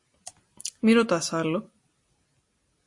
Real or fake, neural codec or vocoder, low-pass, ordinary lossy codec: real; none; 10.8 kHz; MP3, 48 kbps